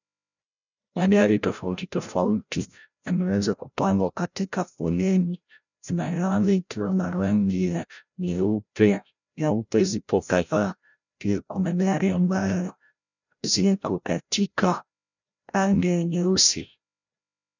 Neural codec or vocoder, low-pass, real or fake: codec, 16 kHz, 0.5 kbps, FreqCodec, larger model; 7.2 kHz; fake